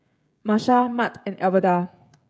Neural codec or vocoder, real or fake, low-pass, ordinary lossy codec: codec, 16 kHz, 16 kbps, FreqCodec, smaller model; fake; none; none